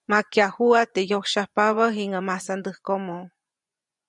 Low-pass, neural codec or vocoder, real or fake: 10.8 kHz; vocoder, 24 kHz, 100 mel bands, Vocos; fake